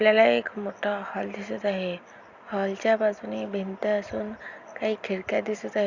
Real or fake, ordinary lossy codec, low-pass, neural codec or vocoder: real; Opus, 64 kbps; 7.2 kHz; none